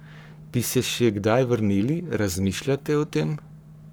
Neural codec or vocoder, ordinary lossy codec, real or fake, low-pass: codec, 44.1 kHz, 7.8 kbps, Pupu-Codec; none; fake; none